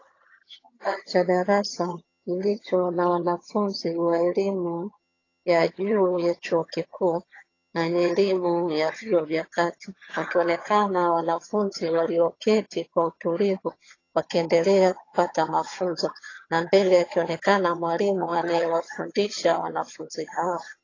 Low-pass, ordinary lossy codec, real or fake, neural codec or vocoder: 7.2 kHz; AAC, 32 kbps; fake; vocoder, 22.05 kHz, 80 mel bands, HiFi-GAN